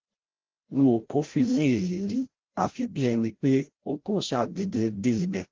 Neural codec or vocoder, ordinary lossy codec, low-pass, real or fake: codec, 16 kHz, 0.5 kbps, FreqCodec, larger model; Opus, 16 kbps; 7.2 kHz; fake